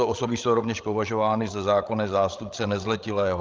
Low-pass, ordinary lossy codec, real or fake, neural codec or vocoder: 7.2 kHz; Opus, 32 kbps; fake; codec, 16 kHz, 16 kbps, FreqCodec, larger model